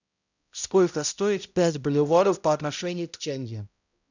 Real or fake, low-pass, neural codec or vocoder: fake; 7.2 kHz; codec, 16 kHz, 0.5 kbps, X-Codec, HuBERT features, trained on balanced general audio